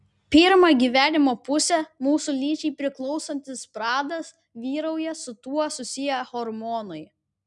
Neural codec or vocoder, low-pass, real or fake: none; 10.8 kHz; real